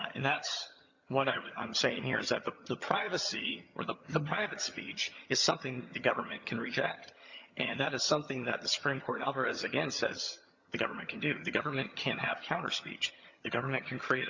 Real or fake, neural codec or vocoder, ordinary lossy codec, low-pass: fake; vocoder, 22.05 kHz, 80 mel bands, HiFi-GAN; Opus, 64 kbps; 7.2 kHz